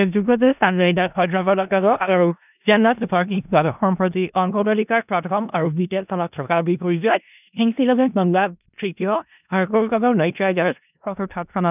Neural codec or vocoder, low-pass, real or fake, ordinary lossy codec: codec, 16 kHz in and 24 kHz out, 0.4 kbps, LongCat-Audio-Codec, four codebook decoder; 3.6 kHz; fake; none